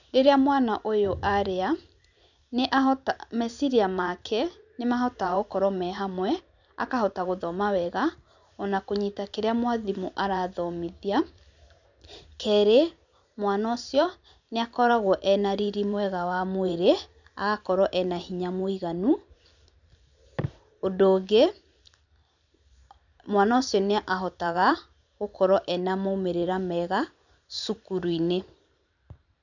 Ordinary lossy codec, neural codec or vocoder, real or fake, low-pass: none; vocoder, 44.1 kHz, 128 mel bands every 512 samples, BigVGAN v2; fake; 7.2 kHz